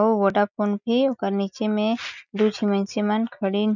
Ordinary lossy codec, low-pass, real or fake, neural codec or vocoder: none; 7.2 kHz; real; none